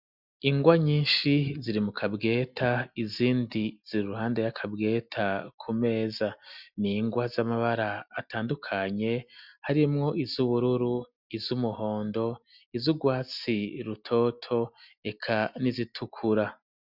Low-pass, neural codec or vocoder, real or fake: 5.4 kHz; none; real